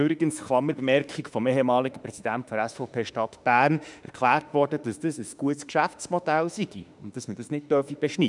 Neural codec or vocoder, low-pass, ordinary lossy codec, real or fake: autoencoder, 48 kHz, 32 numbers a frame, DAC-VAE, trained on Japanese speech; 10.8 kHz; none; fake